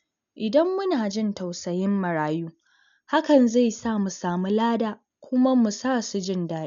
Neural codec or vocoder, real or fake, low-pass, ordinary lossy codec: none; real; 7.2 kHz; none